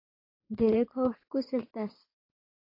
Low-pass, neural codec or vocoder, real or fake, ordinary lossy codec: 5.4 kHz; codec, 16 kHz in and 24 kHz out, 2.2 kbps, FireRedTTS-2 codec; fake; AAC, 32 kbps